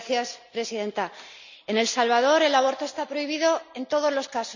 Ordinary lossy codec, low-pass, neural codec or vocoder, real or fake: none; 7.2 kHz; none; real